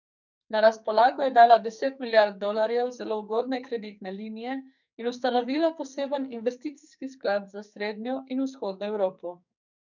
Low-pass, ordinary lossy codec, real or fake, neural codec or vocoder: 7.2 kHz; none; fake; codec, 44.1 kHz, 2.6 kbps, SNAC